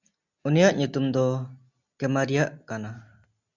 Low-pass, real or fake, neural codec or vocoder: 7.2 kHz; real; none